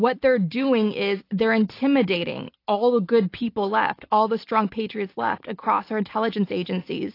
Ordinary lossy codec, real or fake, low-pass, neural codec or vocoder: AAC, 32 kbps; real; 5.4 kHz; none